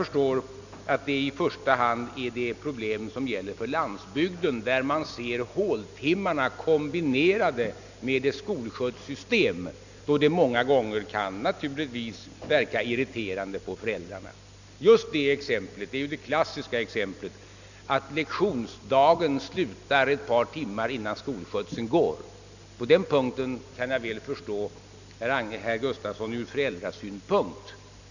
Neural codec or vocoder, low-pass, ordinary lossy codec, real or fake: none; 7.2 kHz; none; real